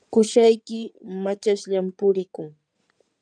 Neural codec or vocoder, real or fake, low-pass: codec, 16 kHz in and 24 kHz out, 2.2 kbps, FireRedTTS-2 codec; fake; 9.9 kHz